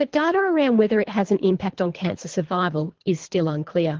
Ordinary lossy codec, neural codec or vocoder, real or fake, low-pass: Opus, 16 kbps; codec, 24 kHz, 3 kbps, HILCodec; fake; 7.2 kHz